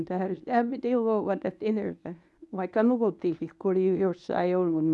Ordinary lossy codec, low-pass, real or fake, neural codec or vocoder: none; none; fake; codec, 24 kHz, 0.9 kbps, WavTokenizer, medium speech release version 1